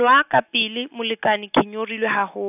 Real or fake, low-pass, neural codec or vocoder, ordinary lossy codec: real; 3.6 kHz; none; none